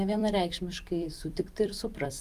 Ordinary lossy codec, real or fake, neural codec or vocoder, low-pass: Opus, 32 kbps; fake; vocoder, 44.1 kHz, 128 mel bands every 256 samples, BigVGAN v2; 14.4 kHz